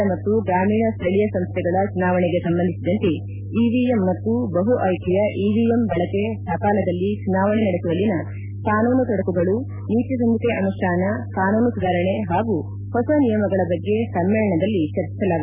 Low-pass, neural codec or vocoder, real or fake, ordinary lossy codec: 3.6 kHz; none; real; none